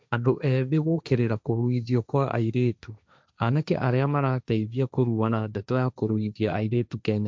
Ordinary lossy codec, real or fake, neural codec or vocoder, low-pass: none; fake; codec, 16 kHz, 1.1 kbps, Voila-Tokenizer; none